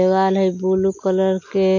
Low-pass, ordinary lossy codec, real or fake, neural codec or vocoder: 7.2 kHz; AAC, 48 kbps; real; none